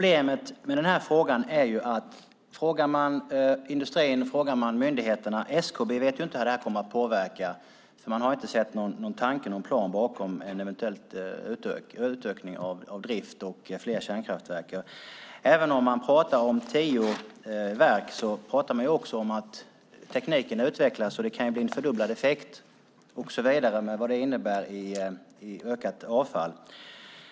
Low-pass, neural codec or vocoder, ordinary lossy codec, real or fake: none; none; none; real